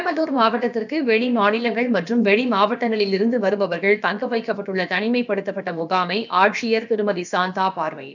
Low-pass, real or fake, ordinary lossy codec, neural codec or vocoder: 7.2 kHz; fake; none; codec, 16 kHz, about 1 kbps, DyCAST, with the encoder's durations